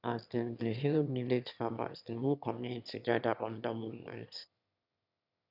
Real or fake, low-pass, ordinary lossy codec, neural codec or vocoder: fake; 5.4 kHz; none; autoencoder, 22.05 kHz, a latent of 192 numbers a frame, VITS, trained on one speaker